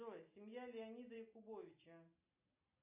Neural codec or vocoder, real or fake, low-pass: none; real; 3.6 kHz